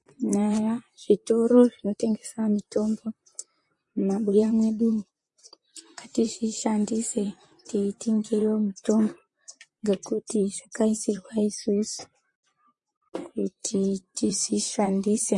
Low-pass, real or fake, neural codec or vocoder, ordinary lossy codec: 10.8 kHz; fake; vocoder, 44.1 kHz, 128 mel bands, Pupu-Vocoder; MP3, 48 kbps